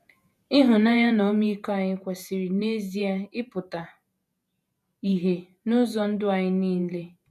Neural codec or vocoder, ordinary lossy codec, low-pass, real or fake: vocoder, 48 kHz, 128 mel bands, Vocos; none; 14.4 kHz; fake